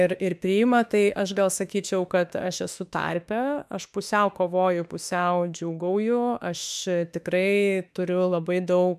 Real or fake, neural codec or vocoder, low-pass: fake; autoencoder, 48 kHz, 32 numbers a frame, DAC-VAE, trained on Japanese speech; 14.4 kHz